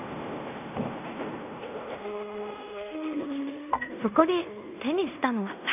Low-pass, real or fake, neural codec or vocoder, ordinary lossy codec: 3.6 kHz; fake; codec, 16 kHz in and 24 kHz out, 0.9 kbps, LongCat-Audio-Codec, fine tuned four codebook decoder; none